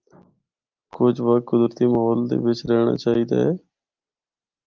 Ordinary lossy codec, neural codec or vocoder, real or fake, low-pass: Opus, 32 kbps; none; real; 7.2 kHz